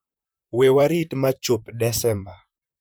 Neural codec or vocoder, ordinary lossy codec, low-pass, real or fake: vocoder, 44.1 kHz, 128 mel bands, Pupu-Vocoder; none; none; fake